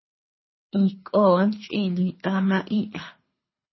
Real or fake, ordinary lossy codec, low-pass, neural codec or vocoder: fake; MP3, 24 kbps; 7.2 kHz; codec, 24 kHz, 3 kbps, HILCodec